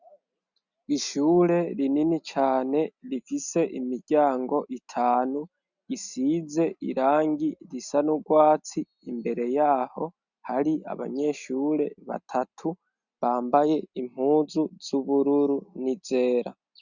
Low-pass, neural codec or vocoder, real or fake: 7.2 kHz; none; real